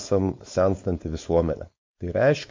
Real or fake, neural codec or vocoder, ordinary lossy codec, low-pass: fake; codec, 16 kHz, 4.8 kbps, FACodec; MP3, 48 kbps; 7.2 kHz